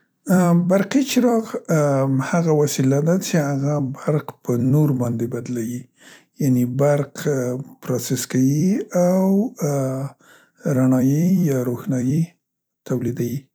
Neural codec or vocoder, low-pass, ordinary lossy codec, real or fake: vocoder, 44.1 kHz, 128 mel bands every 256 samples, BigVGAN v2; none; none; fake